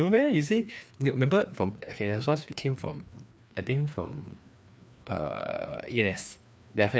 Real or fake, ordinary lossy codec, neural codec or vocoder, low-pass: fake; none; codec, 16 kHz, 2 kbps, FreqCodec, larger model; none